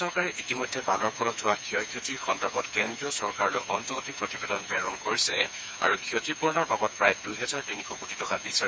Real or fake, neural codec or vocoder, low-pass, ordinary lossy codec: fake; codec, 16 kHz, 4 kbps, FreqCodec, smaller model; none; none